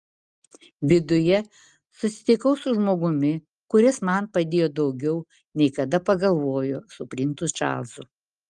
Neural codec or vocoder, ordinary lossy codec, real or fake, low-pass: none; Opus, 32 kbps; real; 10.8 kHz